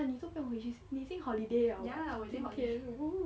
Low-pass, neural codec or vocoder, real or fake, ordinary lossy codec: none; none; real; none